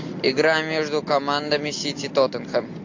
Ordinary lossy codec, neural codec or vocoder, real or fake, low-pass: AAC, 48 kbps; none; real; 7.2 kHz